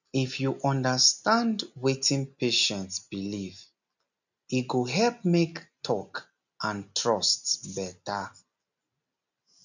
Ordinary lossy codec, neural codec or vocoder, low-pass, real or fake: none; none; 7.2 kHz; real